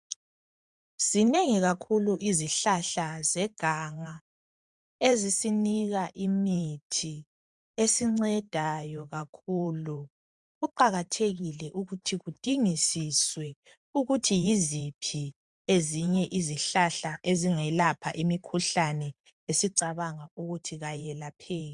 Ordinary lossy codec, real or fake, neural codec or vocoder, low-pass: MP3, 96 kbps; fake; vocoder, 44.1 kHz, 128 mel bands every 512 samples, BigVGAN v2; 10.8 kHz